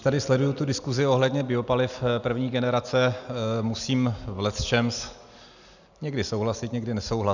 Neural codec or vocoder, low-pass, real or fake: none; 7.2 kHz; real